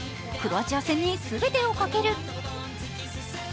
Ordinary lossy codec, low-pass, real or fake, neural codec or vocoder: none; none; real; none